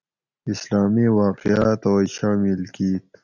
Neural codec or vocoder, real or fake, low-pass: none; real; 7.2 kHz